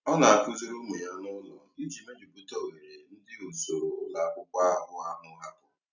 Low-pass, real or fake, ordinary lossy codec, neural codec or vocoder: 7.2 kHz; real; none; none